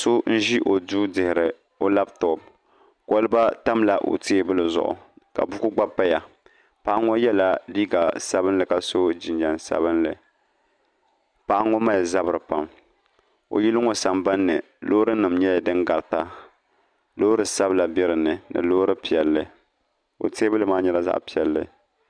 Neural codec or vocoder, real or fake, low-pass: none; real; 9.9 kHz